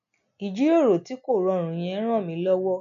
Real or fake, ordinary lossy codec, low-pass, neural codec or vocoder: real; none; 7.2 kHz; none